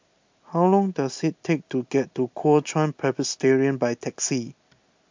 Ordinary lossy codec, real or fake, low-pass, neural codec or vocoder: MP3, 64 kbps; real; 7.2 kHz; none